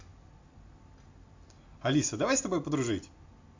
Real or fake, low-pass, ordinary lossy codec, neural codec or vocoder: real; 7.2 kHz; MP3, 48 kbps; none